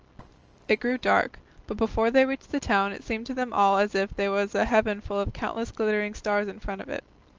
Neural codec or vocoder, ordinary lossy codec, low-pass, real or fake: none; Opus, 24 kbps; 7.2 kHz; real